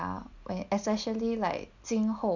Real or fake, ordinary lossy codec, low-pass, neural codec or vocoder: real; none; 7.2 kHz; none